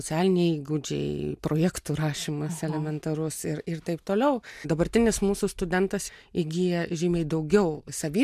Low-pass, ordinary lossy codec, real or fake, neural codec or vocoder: 14.4 kHz; MP3, 96 kbps; real; none